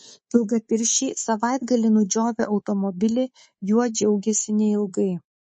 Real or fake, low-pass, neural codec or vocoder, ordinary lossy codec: fake; 10.8 kHz; codec, 24 kHz, 3.1 kbps, DualCodec; MP3, 32 kbps